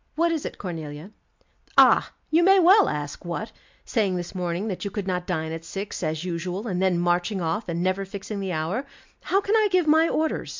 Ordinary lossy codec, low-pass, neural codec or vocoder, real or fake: MP3, 64 kbps; 7.2 kHz; none; real